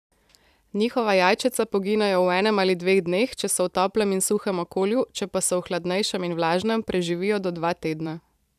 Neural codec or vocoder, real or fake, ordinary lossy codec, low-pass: vocoder, 44.1 kHz, 128 mel bands every 512 samples, BigVGAN v2; fake; none; 14.4 kHz